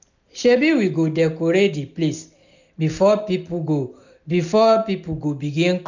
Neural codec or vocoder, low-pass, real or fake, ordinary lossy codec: none; 7.2 kHz; real; none